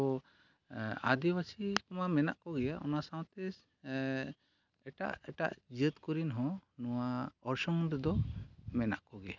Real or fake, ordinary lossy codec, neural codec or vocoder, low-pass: real; none; none; 7.2 kHz